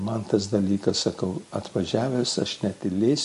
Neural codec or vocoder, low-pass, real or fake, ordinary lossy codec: none; 14.4 kHz; real; MP3, 48 kbps